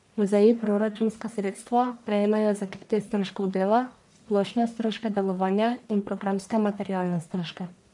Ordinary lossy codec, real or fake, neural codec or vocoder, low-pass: none; fake; codec, 44.1 kHz, 1.7 kbps, Pupu-Codec; 10.8 kHz